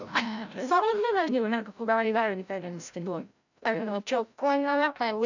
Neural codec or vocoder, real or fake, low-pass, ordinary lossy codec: codec, 16 kHz, 0.5 kbps, FreqCodec, larger model; fake; 7.2 kHz; none